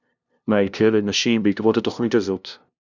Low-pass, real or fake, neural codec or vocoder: 7.2 kHz; fake; codec, 16 kHz, 0.5 kbps, FunCodec, trained on LibriTTS, 25 frames a second